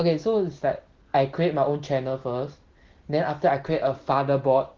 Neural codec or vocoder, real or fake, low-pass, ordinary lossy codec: none; real; 7.2 kHz; Opus, 16 kbps